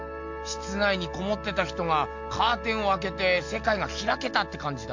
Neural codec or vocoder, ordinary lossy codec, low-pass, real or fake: none; MP3, 48 kbps; 7.2 kHz; real